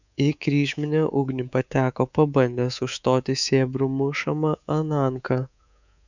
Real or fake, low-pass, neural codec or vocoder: fake; 7.2 kHz; codec, 24 kHz, 3.1 kbps, DualCodec